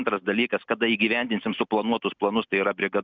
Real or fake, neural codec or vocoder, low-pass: real; none; 7.2 kHz